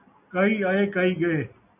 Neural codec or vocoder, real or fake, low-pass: none; real; 3.6 kHz